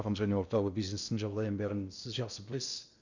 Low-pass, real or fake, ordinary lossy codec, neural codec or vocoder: 7.2 kHz; fake; Opus, 64 kbps; codec, 16 kHz in and 24 kHz out, 0.6 kbps, FocalCodec, streaming, 2048 codes